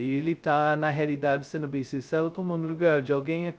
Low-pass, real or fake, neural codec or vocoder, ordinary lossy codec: none; fake; codec, 16 kHz, 0.2 kbps, FocalCodec; none